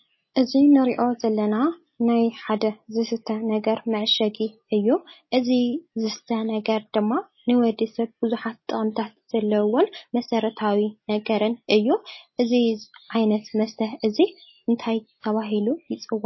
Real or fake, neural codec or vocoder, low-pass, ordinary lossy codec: real; none; 7.2 kHz; MP3, 24 kbps